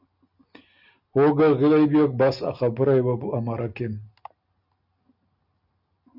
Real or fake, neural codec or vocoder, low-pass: real; none; 5.4 kHz